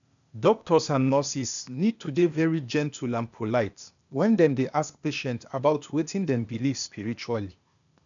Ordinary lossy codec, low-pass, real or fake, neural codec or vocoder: none; 7.2 kHz; fake; codec, 16 kHz, 0.8 kbps, ZipCodec